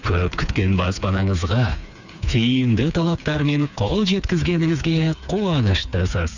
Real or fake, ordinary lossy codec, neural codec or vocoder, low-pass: fake; none; codec, 16 kHz, 4 kbps, FreqCodec, smaller model; 7.2 kHz